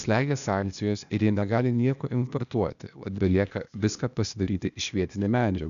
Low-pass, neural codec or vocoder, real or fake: 7.2 kHz; codec, 16 kHz, 0.8 kbps, ZipCodec; fake